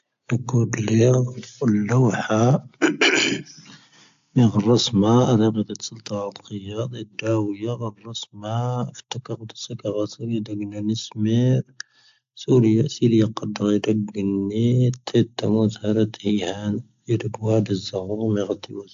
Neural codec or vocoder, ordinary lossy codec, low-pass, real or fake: none; AAC, 64 kbps; 7.2 kHz; real